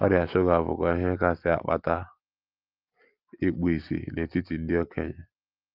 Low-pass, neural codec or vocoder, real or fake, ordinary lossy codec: 5.4 kHz; none; real; Opus, 24 kbps